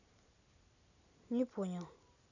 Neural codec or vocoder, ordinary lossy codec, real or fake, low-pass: none; none; real; 7.2 kHz